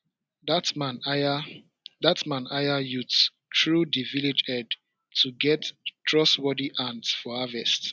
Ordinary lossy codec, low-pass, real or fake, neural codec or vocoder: none; none; real; none